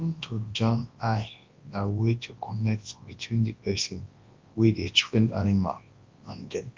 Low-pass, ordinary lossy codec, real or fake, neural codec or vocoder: 7.2 kHz; Opus, 32 kbps; fake; codec, 24 kHz, 0.9 kbps, WavTokenizer, large speech release